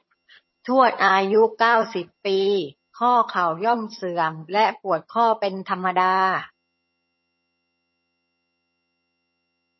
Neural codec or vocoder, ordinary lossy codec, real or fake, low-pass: vocoder, 22.05 kHz, 80 mel bands, HiFi-GAN; MP3, 24 kbps; fake; 7.2 kHz